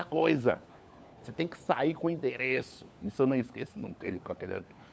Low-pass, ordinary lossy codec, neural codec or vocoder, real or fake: none; none; codec, 16 kHz, 4 kbps, FunCodec, trained on LibriTTS, 50 frames a second; fake